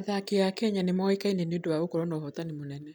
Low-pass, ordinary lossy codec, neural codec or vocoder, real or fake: none; none; none; real